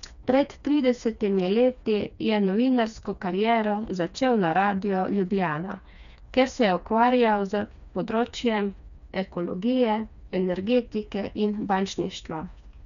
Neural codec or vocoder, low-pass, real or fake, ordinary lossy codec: codec, 16 kHz, 2 kbps, FreqCodec, smaller model; 7.2 kHz; fake; none